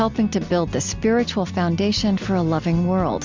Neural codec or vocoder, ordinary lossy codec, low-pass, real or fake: none; MP3, 64 kbps; 7.2 kHz; real